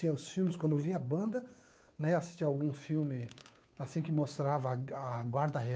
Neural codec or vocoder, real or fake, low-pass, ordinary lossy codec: codec, 16 kHz, 2 kbps, FunCodec, trained on Chinese and English, 25 frames a second; fake; none; none